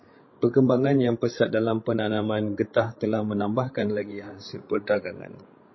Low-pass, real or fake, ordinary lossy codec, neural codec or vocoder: 7.2 kHz; fake; MP3, 24 kbps; codec, 16 kHz, 16 kbps, FreqCodec, larger model